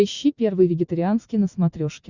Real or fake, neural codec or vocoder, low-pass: real; none; 7.2 kHz